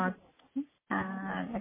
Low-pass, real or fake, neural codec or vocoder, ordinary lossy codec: 3.6 kHz; real; none; MP3, 16 kbps